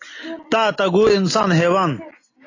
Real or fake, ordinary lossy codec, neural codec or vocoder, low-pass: real; AAC, 32 kbps; none; 7.2 kHz